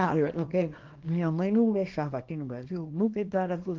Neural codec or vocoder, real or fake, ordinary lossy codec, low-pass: codec, 24 kHz, 0.9 kbps, WavTokenizer, small release; fake; Opus, 16 kbps; 7.2 kHz